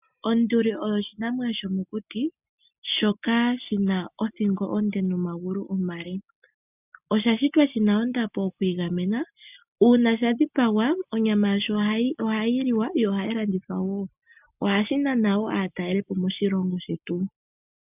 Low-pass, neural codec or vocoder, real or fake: 3.6 kHz; none; real